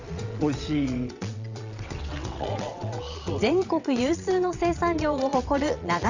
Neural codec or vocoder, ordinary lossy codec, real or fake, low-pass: vocoder, 22.05 kHz, 80 mel bands, WaveNeXt; Opus, 64 kbps; fake; 7.2 kHz